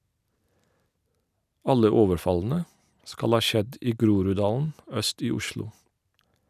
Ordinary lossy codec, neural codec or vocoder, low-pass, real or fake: none; none; 14.4 kHz; real